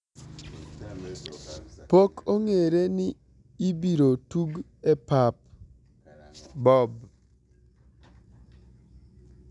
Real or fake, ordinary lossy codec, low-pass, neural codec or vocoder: real; none; 10.8 kHz; none